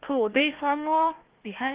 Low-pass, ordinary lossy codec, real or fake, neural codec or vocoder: 3.6 kHz; Opus, 16 kbps; fake; codec, 16 kHz, 1 kbps, FunCodec, trained on Chinese and English, 50 frames a second